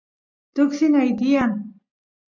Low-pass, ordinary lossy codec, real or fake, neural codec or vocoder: 7.2 kHz; MP3, 64 kbps; real; none